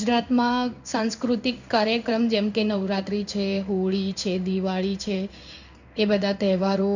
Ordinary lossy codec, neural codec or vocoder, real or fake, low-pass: none; codec, 16 kHz in and 24 kHz out, 1 kbps, XY-Tokenizer; fake; 7.2 kHz